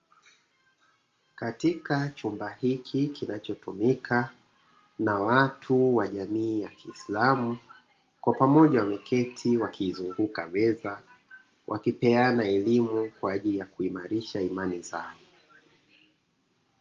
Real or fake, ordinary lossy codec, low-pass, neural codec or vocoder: real; Opus, 32 kbps; 7.2 kHz; none